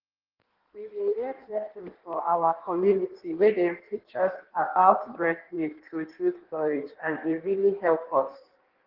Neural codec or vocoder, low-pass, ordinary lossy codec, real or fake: codec, 16 kHz in and 24 kHz out, 1.1 kbps, FireRedTTS-2 codec; 5.4 kHz; Opus, 16 kbps; fake